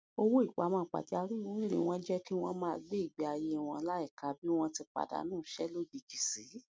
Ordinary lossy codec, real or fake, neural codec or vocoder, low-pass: none; real; none; none